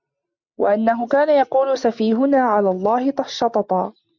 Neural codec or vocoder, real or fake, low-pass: none; real; 7.2 kHz